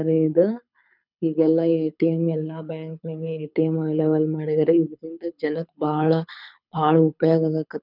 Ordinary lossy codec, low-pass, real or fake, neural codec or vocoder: none; 5.4 kHz; fake; codec, 24 kHz, 6 kbps, HILCodec